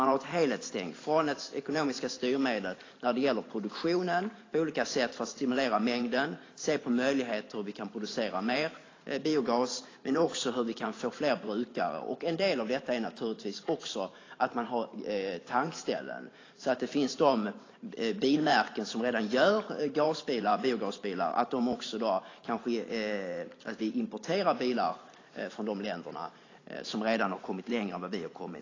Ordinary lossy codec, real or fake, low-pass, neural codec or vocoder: AAC, 32 kbps; fake; 7.2 kHz; vocoder, 44.1 kHz, 128 mel bands every 512 samples, BigVGAN v2